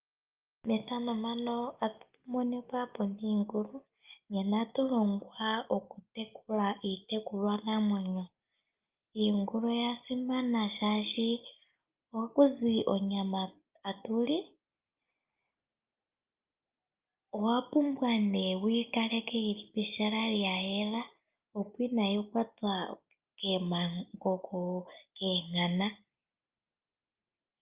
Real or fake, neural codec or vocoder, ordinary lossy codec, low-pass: real; none; Opus, 24 kbps; 3.6 kHz